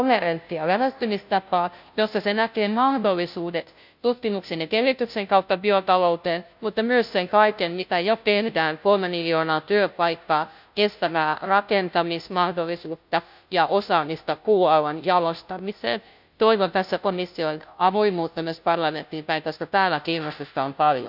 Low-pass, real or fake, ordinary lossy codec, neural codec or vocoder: 5.4 kHz; fake; none; codec, 16 kHz, 0.5 kbps, FunCodec, trained on Chinese and English, 25 frames a second